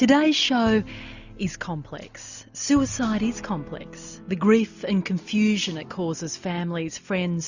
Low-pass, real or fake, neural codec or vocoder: 7.2 kHz; real; none